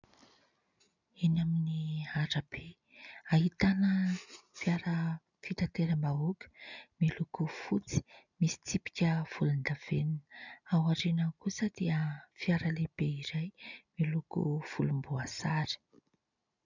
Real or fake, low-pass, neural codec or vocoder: real; 7.2 kHz; none